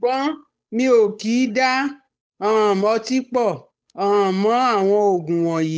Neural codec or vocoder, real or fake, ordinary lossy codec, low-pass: codec, 16 kHz, 8 kbps, FunCodec, trained on Chinese and English, 25 frames a second; fake; none; none